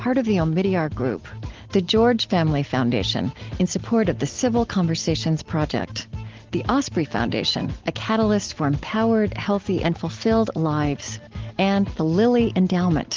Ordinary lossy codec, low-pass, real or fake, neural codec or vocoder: Opus, 16 kbps; 7.2 kHz; real; none